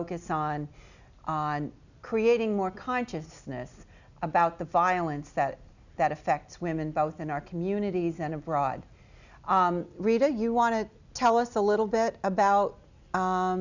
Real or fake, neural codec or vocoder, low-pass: real; none; 7.2 kHz